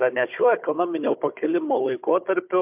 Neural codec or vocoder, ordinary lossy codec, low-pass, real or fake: codec, 16 kHz, 16 kbps, FunCodec, trained on Chinese and English, 50 frames a second; MP3, 32 kbps; 3.6 kHz; fake